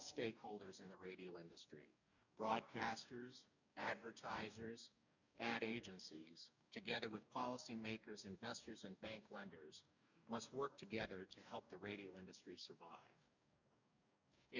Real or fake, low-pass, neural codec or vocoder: fake; 7.2 kHz; codec, 44.1 kHz, 2.6 kbps, DAC